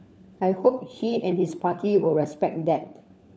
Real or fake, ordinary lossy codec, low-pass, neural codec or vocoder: fake; none; none; codec, 16 kHz, 4 kbps, FunCodec, trained on LibriTTS, 50 frames a second